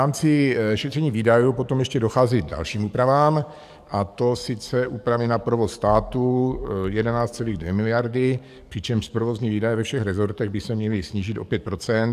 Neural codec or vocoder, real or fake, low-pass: codec, 44.1 kHz, 7.8 kbps, DAC; fake; 14.4 kHz